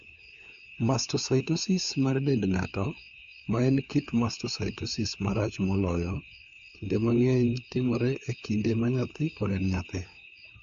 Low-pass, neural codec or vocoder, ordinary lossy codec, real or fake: 7.2 kHz; codec, 16 kHz, 4 kbps, FunCodec, trained on LibriTTS, 50 frames a second; AAC, 64 kbps; fake